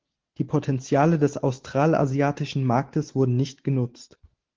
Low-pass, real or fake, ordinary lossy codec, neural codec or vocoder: 7.2 kHz; real; Opus, 16 kbps; none